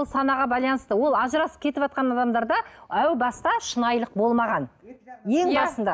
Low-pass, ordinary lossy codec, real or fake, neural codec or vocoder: none; none; real; none